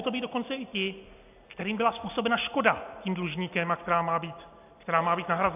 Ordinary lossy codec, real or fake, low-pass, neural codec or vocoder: AAC, 32 kbps; real; 3.6 kHz; none